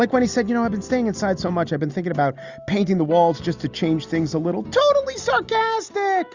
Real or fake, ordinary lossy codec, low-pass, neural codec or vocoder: real; Opus, 64 kbps; 7.2 kHz; none